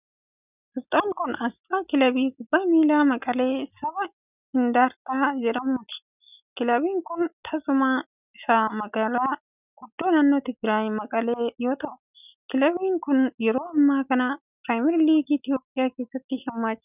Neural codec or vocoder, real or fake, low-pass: none; real; 3.6 kHz